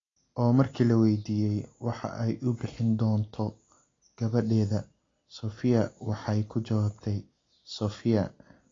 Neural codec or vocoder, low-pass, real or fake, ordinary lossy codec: none; 7.2 kHz; real; AAC, 32 kbps